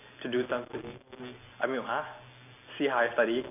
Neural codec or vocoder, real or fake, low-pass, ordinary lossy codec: none; real; 3.6 kHz; none